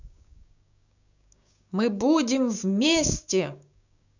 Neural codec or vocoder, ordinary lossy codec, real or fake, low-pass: codec, 16 kHz, 6 kbps, DAC; none; fake; 7.2 kHz